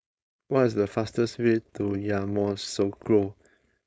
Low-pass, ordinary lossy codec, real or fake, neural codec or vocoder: none; none; fake; codec, 16 kHz, 4.8 kbps, FACodec